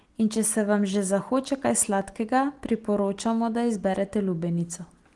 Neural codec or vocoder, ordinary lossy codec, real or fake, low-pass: none; Opus, 24 kbps; real; 10.8 kHz